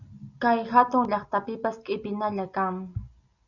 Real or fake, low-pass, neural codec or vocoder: real; 7.2 kHz; none